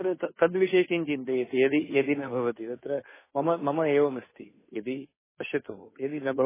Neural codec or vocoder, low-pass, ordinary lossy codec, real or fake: codec, 16 kHz, 2 kbps, FunCodec, trained on Chinese and English, 25 frames a second; 3.6 kHz; MP3, 16 kbps; fake